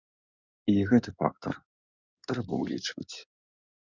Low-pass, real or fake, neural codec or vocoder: 7.2 kHz; fake; codec, 16 kHz in and 24 kHz out, 2.2 kbps, FireRedTTS-2 codec